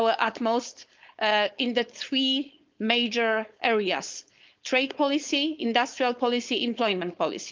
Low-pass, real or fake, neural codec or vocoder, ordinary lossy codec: 7.2 kHz; fake; codec, 16 kHz, 4.8 kbps, FACodec; Opus, 24 kbps